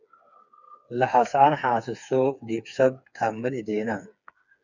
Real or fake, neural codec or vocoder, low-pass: fake; codec, 16 kHz, 4 kbps, FreqCodec, smaller model; 7.2 kHz